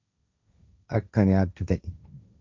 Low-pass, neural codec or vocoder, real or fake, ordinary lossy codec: 7.2 kHz; codec, 16 kHz, 1.1 kbps, Voila-Tokenizer; fake; MP3, 64 kbps